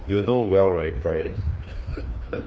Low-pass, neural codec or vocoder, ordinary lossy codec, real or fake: none; codec, 16 kHz, 2 kbps, FreqCodec, larger model; none; fake